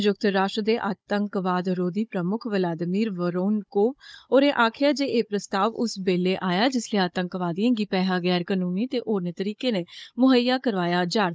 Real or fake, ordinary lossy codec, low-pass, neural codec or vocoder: fake; none; none; codec, 16 kHz, 16 kbps, FunCodec, trained on Chinese and English, 50 frames a second